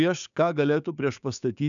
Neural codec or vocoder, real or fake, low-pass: codec, 16 kHz, 6 kbps, DAC; fake; 7.2 kHz